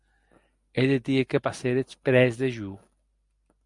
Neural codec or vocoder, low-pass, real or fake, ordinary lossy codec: none; 10.8 kHz; real; Opus, 64 kbps